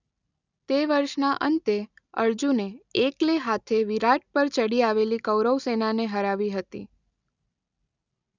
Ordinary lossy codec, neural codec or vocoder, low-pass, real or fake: none; none; 7.2 kHz; real